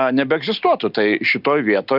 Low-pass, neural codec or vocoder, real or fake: 5.4 kHz; none; real